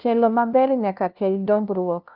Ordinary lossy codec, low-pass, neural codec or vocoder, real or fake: Opus, 32 kbps; 5.4 kHz; codec, 16 kHz, 0.5 kbps, FunCodec, trained on LibriTTS, 25 frames a second; fake